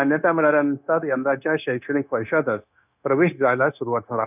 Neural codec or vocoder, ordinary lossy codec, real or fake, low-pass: codec, 16 kHz, 0.9 kbps, LongCat-Audio-Codec; none; fake; 3.6 kHz